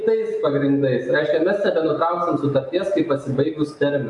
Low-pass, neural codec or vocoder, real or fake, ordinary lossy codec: 10.8 kHz; none; real; AAC, 64 kbps